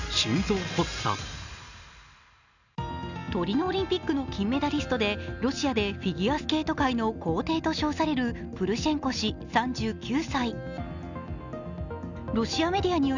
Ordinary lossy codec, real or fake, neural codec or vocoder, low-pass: none; real; none; 7.2 kHz